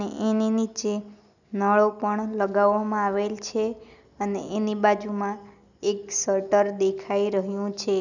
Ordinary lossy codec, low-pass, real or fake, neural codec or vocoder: none; 7.2 kHz; real; none